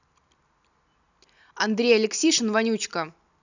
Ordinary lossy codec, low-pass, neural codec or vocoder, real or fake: none; 7.2 kHz; none; real